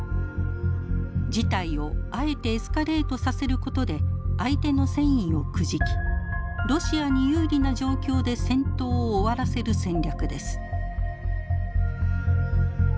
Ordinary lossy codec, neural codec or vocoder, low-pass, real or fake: none; none; none; real